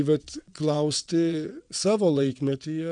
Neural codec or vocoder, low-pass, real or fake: vocoder, 22.05 kHz, 80 mel bands, WaveNeXt; 9.9 kHz; fake